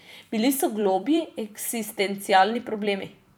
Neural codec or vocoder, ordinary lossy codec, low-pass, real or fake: vocoder, 44.1 kHz, 128 mel bands every 256 samples, BigVGAN v2; none; none; fake